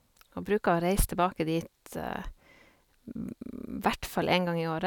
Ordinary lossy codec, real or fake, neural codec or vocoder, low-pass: none; real; none; 19.8 kHz